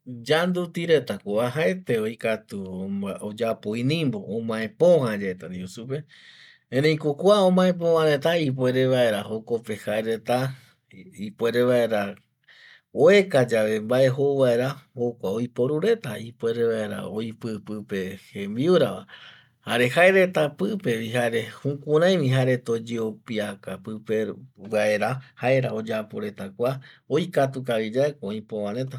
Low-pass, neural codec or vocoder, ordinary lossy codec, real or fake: 19.8 kHz; none; none; real